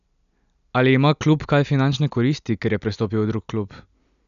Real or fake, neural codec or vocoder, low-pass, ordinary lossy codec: real; none; 7.2 kHz; none